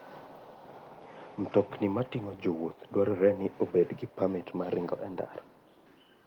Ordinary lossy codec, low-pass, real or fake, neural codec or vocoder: Opus, 24 kbps; 19.8 kHz; real; none